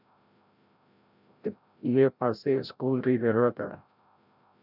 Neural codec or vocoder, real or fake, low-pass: codec, 16 kHz, 0.5 kbps, FreqCodec, larger model; fake; 5.4 kHz